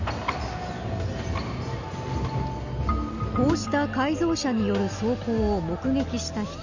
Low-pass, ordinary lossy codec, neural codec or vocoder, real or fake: 7.2 kHz; none; none; real